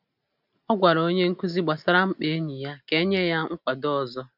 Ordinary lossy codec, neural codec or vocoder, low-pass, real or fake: none; none; 5.4 kHz; real